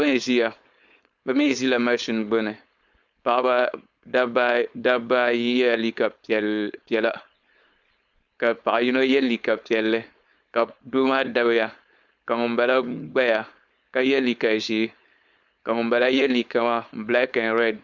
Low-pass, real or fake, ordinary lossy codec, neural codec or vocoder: 7.2 kHz; fake; Opus, 64 kbps; codec, 16 kHz, 4.8 kbps, FACodec